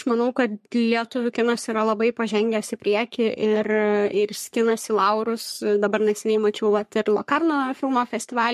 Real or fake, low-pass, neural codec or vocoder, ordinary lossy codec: fake; 14.4 kHz; codec, 44.1 kHz, 3.4 kbps, Pupu-Codec; MP3, 64 kbps